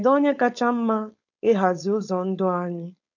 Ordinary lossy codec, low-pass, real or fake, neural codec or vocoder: none; 7.2 kHz; fake; codec, 16 kHz, 4.8 kbps, FACodec